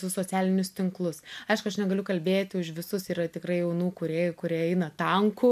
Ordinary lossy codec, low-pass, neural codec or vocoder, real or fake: MP3, 96 kbps; 14.4 kHz; none; real